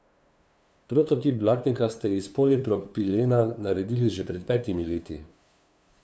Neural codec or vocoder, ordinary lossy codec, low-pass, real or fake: codec, 16 kHz, 2 kbps, FunCodec, trained on LibriTTS, 25 frames a second; none; none; fake